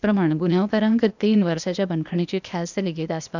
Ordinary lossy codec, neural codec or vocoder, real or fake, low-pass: none; codec, 16 kHz, 0.8 kbps, ZipCodec; fake; 7.2 kHz